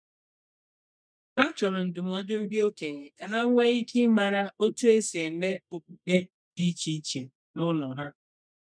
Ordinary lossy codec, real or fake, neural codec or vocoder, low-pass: none; fake; codec, 24 kHz, 0.9 kbps, WavTokenizer, medium music audio release; 9.9 kHz